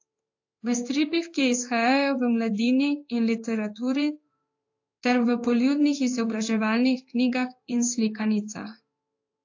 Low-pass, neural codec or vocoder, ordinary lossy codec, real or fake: 7.2 kHz; codec, 16 kHz in and 24 kHz out, 1 kbps, XY-Tokenizer; AAC, 48 kbps; fake